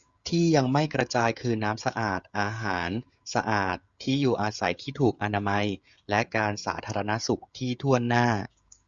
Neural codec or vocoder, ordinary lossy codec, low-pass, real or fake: codec, 16 kHz, 16 kbps, FreqCodec, smaller model; Opus, 64 kbps; 7.2 kHz; fake